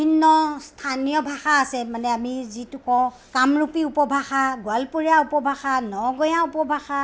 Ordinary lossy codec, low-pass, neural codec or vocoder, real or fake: none; none; none; real